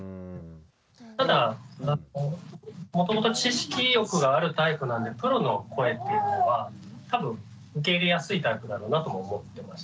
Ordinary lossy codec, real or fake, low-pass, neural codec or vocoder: none; real; none; none